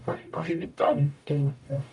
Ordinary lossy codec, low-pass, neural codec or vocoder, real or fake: AAC, 64 kbps; 10.8 kHz; codec, 44.1 kHz, 0.9 kbps, DAC; fake